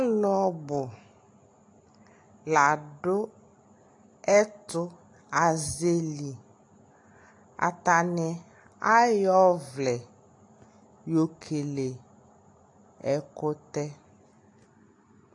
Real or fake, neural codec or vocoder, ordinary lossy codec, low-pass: real; none; AAC, 48 kbps; 10.8 kHz